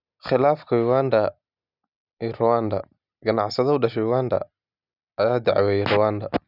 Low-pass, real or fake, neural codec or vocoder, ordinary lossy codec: 5.4 kHz; real; none; none